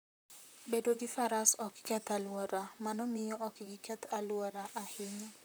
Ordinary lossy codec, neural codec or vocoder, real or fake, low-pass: none; vocoder, 44.1 kHz, 128 mel bands, Pupu-Vocoder; fake; none